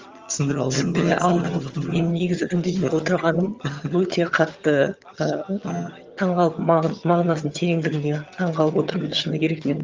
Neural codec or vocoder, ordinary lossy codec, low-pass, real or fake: vocoder, 22.05 kHz, 80 mel bands, HiFi-GAN; Opus, 32 kbps; 7.2 kHz; fake